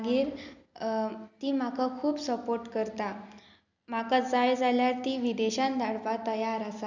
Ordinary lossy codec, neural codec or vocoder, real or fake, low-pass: none; none; real; 7.2 kHz